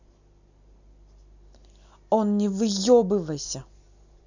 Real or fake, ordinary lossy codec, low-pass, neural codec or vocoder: real; none; 7.2 kHz; none